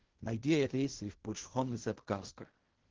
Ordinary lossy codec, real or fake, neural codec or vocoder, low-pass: Opus, 16 kbps; fake; codec, 16 kHz in and 24 kHz out, 0.4 kbps, LongCat-Audio-Codec, fine tuned four codebook decoder; 7.2 kHz